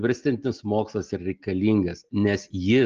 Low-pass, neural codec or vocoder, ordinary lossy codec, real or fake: 7.2 kHz; none; Opus, 24 kbps; real